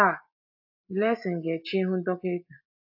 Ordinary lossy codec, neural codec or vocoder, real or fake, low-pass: AAC, 48 kbps; none; real; 5.4 kHz